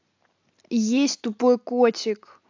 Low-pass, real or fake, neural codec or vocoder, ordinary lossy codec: 7.2 kHz; real; none; AAC, 48 kbps